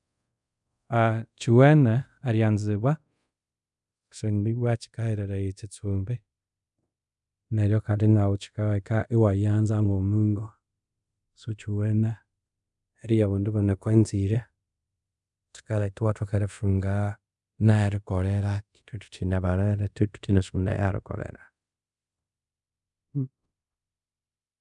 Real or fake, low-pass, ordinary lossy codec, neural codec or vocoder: fake; 10.8 kHz; none; codec, 24 kHz, 0.5 kbps, DualCodec